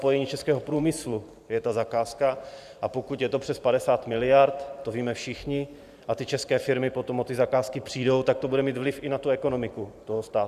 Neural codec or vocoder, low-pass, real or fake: vocoder, 44.1 kHz, 128 mel bands every 512 samples, BigVGAN v2; 14.4 kHz; fake